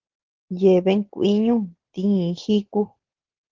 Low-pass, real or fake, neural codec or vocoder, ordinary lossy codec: 7.2 kHz; real; none; Opus, 16 kbps